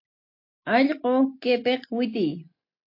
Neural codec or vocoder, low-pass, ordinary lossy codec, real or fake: none; 5.4 kHz; MP3, 32 kbps; real